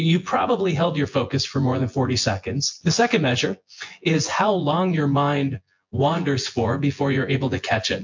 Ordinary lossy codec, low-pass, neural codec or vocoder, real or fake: MP3, 48 kbps; 7.2 kHz; vocoder, 24 kHz, 100 mel bands, Vocos; fake